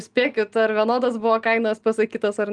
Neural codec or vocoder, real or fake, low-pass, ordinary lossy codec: none; real; 10.8 kHz; Opus, 24 kbps